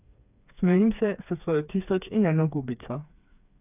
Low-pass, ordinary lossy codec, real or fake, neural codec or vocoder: 3.6 kHz; none; fake; codec, 16 kHz, 4 kbps, FreqCodec, smaller model